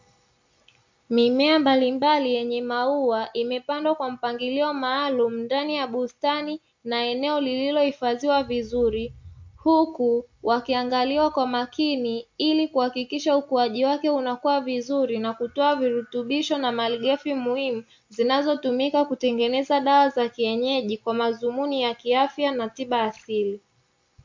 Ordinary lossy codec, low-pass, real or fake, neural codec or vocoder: MP3, 48 kbps; 7.2 kHz; real; none